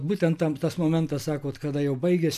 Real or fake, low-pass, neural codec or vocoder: real; 14.4 kHz; none